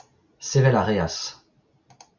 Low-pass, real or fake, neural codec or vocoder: 7.2 kHz; real; none